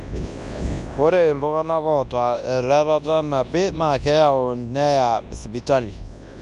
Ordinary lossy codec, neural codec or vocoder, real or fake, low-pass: none; codec, 24 kHz, 0.9 kbps, WavTokenizer, large speech release; fake; 10.8 kHz